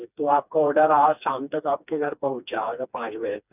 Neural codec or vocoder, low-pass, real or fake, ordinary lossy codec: codec, 16 kHz, 2 kbps, FreqCodec, smaller model; 3.6 kHz; fake; none